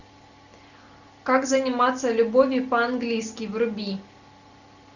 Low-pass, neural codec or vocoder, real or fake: 7.2 kHz; none; real